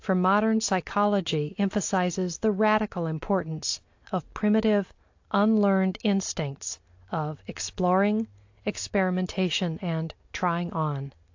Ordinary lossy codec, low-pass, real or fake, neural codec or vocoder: AAC, 48 kbps; 7.2 kHz; real; none